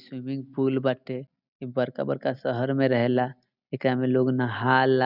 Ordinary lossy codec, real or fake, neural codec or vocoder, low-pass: none; real; none; 5.4 kHz